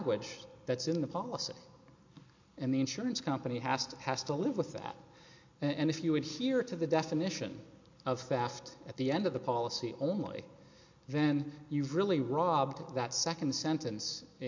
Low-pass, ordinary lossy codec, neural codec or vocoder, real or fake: 7.2 kHz; MP3, 48 kbps; none; real